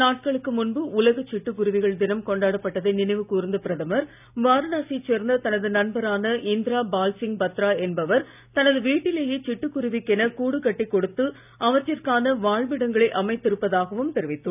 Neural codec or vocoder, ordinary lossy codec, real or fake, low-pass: none; none; real; 3.6 kHz